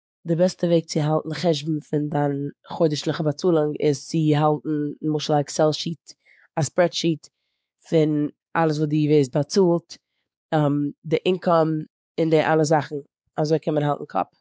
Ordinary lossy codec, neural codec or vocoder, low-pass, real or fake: none; codec, 16 kHz, 4 kbps, X-Codec, WavLM features, trained on Multilingual LibriSpeech; none; fake